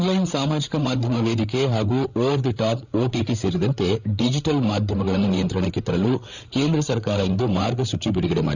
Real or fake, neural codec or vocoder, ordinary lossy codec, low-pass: fake; codec, 16 kHz, 8 kbps, FreqCodec, larger model; none; 7.2 kHz